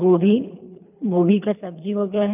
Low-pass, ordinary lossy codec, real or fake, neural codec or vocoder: 3.6 kHz; none; fake; codec, 24 kHz, 3 kbps, HILCodec